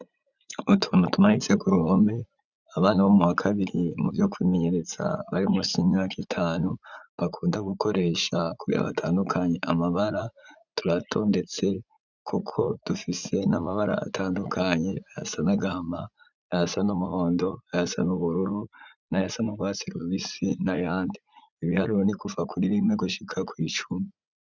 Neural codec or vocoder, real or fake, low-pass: vocoder, 44.1 kHz, 80 mel bands, Vocos; fake; 7.2 kHz